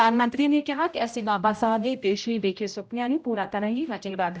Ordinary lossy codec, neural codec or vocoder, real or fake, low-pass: none; codec, 16 kHz, 0.5 kbps, X-Codec, HuBERT features, trained on general audio; fake; none